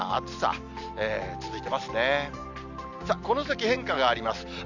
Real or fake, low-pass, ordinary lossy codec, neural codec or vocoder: real; 7.2 kHz; none; none